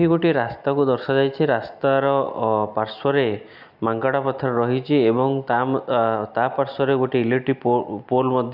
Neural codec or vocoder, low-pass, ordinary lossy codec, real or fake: none; 5.4 kHz; none; real